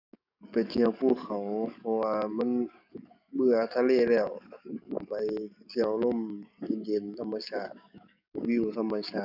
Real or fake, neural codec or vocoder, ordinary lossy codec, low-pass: fake; codec, 44.1 kHz, 7.8 kbps, DAC; none; 5.4 kHz